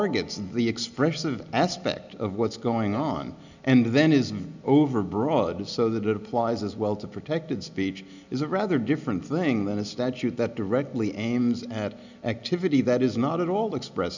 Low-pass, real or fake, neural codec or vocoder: 7.2 kHz; real; none